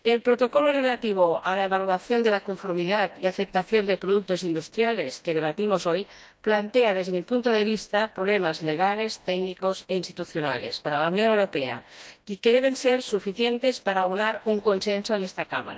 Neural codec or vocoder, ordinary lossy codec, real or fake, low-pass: codec, 16 kHz, 1 kbps, FreqCodec, smaller model; none; fake; none